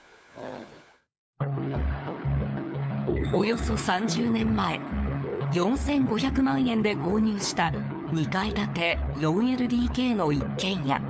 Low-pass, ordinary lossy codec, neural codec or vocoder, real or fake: none; none; codec, 16 kHz, 4 kbps, FunCodec, trained on LibriTTS, 50 frames a second; fake